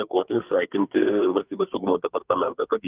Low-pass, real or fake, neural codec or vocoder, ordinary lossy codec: 3.6 kHz; fake; codec, 44.1 kHz, 2.6 kbps, SNAC; Opus, 32 kbps